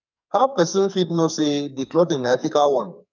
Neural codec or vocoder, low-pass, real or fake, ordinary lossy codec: codec, 44.1 kHz, 2.6 kbps, SNAC; 7.2 kHz; fake; none